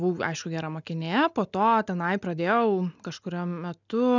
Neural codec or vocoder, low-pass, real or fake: none; 7.2 kHz; real